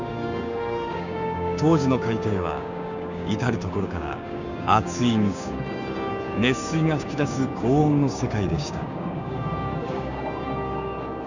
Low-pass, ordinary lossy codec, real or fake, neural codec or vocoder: 7.2 kHz; none; fake; codec, 16 kHz, 6 kbps, DAC